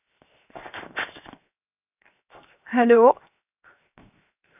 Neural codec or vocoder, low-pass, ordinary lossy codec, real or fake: codec, 16 kHz, 0.7 kbps, FocalCodec; 3.6 kHz; none; fake